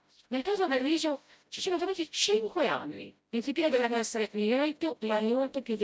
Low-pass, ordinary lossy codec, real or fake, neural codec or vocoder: none; none; fake; codec, 16 kHz, 0.5 kbps, FreqCodec, smaller model